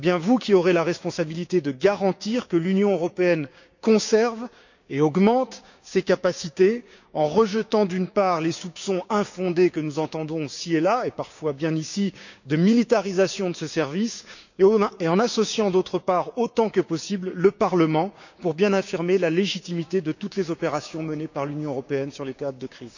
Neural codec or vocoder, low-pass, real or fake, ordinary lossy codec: codec, 16 kHz, 6 kbps, DAC; 7.2 kHz; fake; none